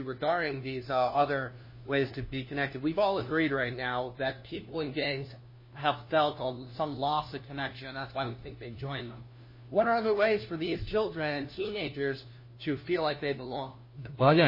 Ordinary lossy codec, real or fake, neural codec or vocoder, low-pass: MP3, 24 kbps; fake; codec, 16 kHz, 1 kbps, FunCodec, trained on LibriTTS, 50 frames a second; 5.4 kHz